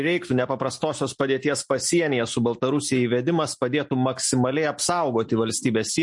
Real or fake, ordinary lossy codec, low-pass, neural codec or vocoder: real; MP3, 48 kbps; 10.8 kHz; none